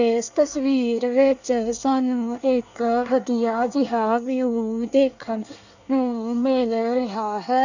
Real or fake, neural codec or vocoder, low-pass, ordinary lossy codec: fake; codec, 24 kHz, 1 kbps, SNAC; 7.2 kHz; none